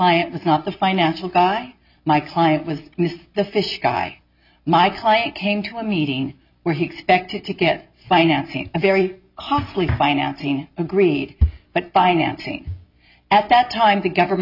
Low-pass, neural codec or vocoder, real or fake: 5.4 kHz; none; real